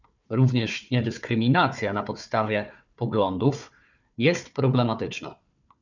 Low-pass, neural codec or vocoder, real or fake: 7.2 kHz; codec, 16 kHz, 4 kbps, FunCodec, trained on Chinese and English, 50 frames a second; fake